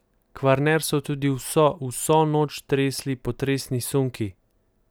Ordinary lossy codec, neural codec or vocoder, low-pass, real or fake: none; none; none; real